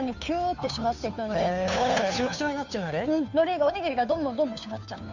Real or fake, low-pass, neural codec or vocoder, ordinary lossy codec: fake; 7.2 kHz; codec, 16 kHz, 2 kbps, FunCodec, trained on Chinese and English, 25 frames a second; none